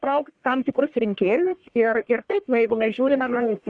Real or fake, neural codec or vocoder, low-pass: fake; codec, 44.1 kHz, 1.7 kbps, Pupu-Codec; 9.9 kHz